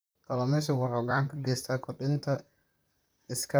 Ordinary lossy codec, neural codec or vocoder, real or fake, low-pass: none; vocoder, 44.1 kHz, 128 mel bands, Pupu-Vocoder; fake; none